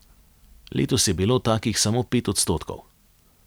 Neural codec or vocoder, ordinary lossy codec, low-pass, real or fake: vocoder, 44.1 kHz, 128 mel bands every 256 samples, BigVGAN v2; none; none; fake